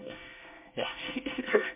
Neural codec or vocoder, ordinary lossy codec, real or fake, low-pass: codec, 24 kHz, 1 kbps, SNAC; MP3, 32 kbps; fake; 3.6 kHz